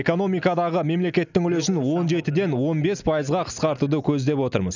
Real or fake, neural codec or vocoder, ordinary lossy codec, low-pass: real; none; none; 7.2 kHz